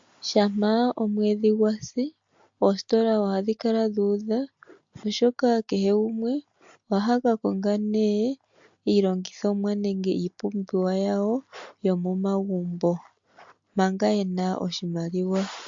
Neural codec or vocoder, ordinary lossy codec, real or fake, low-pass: none; MP3, 48 kbps; real; 7.2 kHz